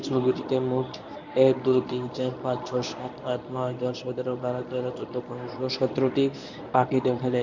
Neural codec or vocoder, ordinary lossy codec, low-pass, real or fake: codec, 24 kHz, 0.9 kbps, WavTokenizer, medium speech release version 1; none; 7.2 kHz; fake